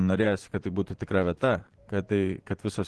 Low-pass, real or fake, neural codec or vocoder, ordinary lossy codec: 10.8 kHz; fake; vocoder, 24 kHz, 100 mel bands, Vocos; Opus, 16 kbps